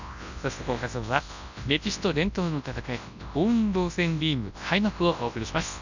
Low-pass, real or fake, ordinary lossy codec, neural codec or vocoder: 7.2 kHz; fake; none; codec, 24 kHz, 0.9 kbps, WavTokenizer, large speech release